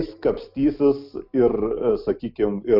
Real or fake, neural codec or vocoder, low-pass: real; none; 5.4 kHz